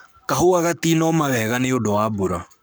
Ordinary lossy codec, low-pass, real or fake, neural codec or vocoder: none; none; fake; codec, 44.1 kHz, 7.8 kbps, Pupu-Codec